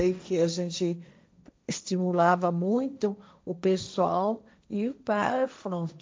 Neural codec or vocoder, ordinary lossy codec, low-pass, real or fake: codec, 16 kHz, 1.1 kbps, Voila-Tokenizer; none; none; fake